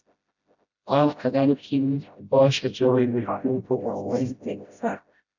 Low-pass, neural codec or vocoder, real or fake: 7.2 kHz; codec, 16 kHz, 0.5 kbps, FreqCodec, smaller model; fake